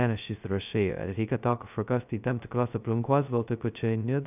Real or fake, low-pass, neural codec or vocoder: fake; 3.6 kHz; codec, 16 kHz, 0.2 kbps, FocalCodec